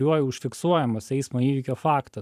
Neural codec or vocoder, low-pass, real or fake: vocoder, 44.1 kHz, 128 mel bands every 512 samples, BigVGAN v2; 14.4 kHz; fake